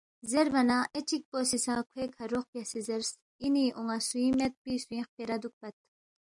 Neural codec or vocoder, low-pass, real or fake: none; 10.8 kHz; real